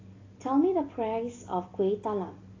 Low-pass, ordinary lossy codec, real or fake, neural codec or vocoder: 7.2 kHz; AAC, 32 kbps; real; none